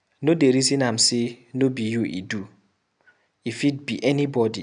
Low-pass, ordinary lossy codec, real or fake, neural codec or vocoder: 9.9 kHz; none; real; none